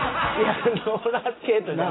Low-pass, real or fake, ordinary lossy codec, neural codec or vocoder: 7.2 kHz; real; AAC, 16 kbps; none